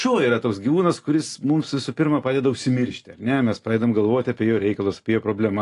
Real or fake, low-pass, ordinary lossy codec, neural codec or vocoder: real; 10.8 kHz; AAC, 48 kbps; none